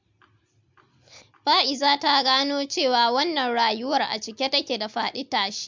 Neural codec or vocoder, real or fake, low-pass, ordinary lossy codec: none; real; 7.2 kHz; MP3, 64 kbps